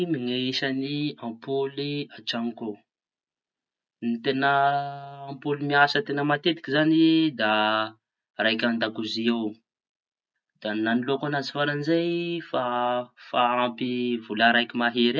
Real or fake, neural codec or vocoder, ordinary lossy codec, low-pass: real; none; none; none